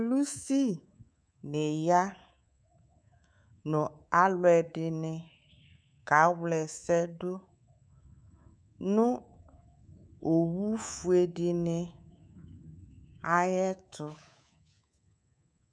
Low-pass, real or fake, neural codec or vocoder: 9.9 kHz; fake; codec, 24 kHz, 3.1 kbps, DualCodec